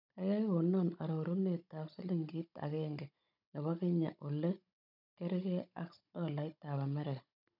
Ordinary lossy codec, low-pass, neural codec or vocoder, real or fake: none; 5.4 kHz; codec, 16 kHz, 16 kbps, FunCodec, trained on Chinese and English, 50 frames a second; fake